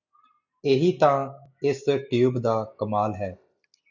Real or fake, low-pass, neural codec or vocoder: real; 7.2 kHz; none